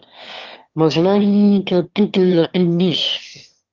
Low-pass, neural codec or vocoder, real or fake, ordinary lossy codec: 7.2 kHz; autoencoder, 22.05 kHz, a latent of 192 numbers a frame, VITS, trained on one speaker; fake; Opus, 32 kbps